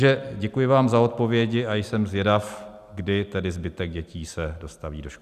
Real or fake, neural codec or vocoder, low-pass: real; none; 14.4 kHz